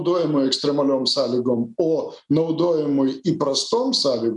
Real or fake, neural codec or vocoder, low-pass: real; none; 10.8 kHz